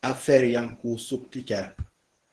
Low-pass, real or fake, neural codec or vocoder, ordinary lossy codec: 10.8 kHz; fake; codec, 24 kHz, 0.9 kbps, WavTokenizer, medium speech release version 1; Opus, 16 kbps